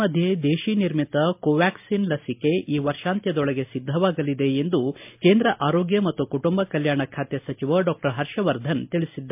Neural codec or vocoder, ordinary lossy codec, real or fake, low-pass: none; MP3, 32 kbps; real; 3.6 kHz